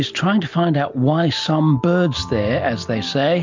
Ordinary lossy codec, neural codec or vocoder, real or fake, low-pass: MP3, 64 kbps; none; real; 7.2 kHz